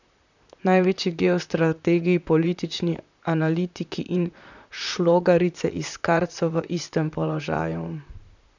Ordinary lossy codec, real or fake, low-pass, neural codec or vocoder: none; fake; 7.2 kHz; vocoder, 44.1 kHz, 128 mel bands, Pupu-Vocoder